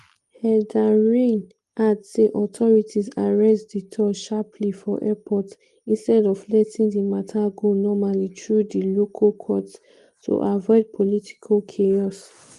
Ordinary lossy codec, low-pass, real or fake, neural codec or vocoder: Opus, 24 kbps; 10.8 kHz; real; none